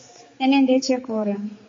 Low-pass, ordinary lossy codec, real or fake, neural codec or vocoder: 7.2 kHz; MP3, 32 kbps; fake; codec, 16 kHz, 4 kbps, X-Codec, HuBERT features, trained on general audio